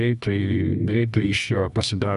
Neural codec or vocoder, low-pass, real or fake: codec, 24 kHz, 0.9 kbps, WavTokenizer, medium music audio release; 10.8 kHz; fake